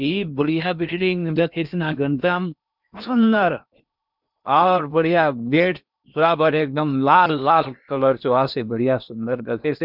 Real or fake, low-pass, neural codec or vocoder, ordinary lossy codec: fake; 5.4 kHz; codec, 16 kHz in and 24 kHz out, 0.8 kbps, FocalCodec, streaming, 65536 codes; none